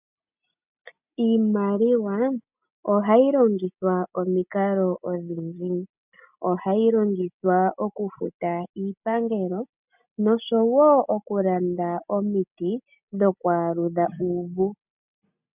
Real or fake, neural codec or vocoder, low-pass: real; none; 3.6 kHz